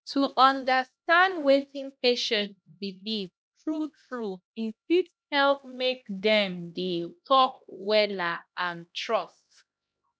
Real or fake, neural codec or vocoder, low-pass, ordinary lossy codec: fake; codec, 16 kHz, 1 kbps, X-Codec, HuBERT features, trained on LibriSpeech; none; none